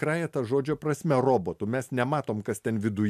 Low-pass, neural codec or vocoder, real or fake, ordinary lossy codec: 14.4 kHz; none; real; MP3, 96 kbps